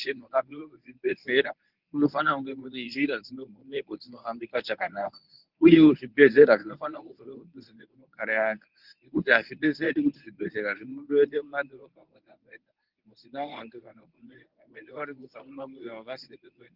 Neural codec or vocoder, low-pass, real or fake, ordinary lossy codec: codec, 24 kHz, 0.9 kbps, WavTokenizer, medium speech release version 1; 5.4 kHz; fake; Opus, 32 kbps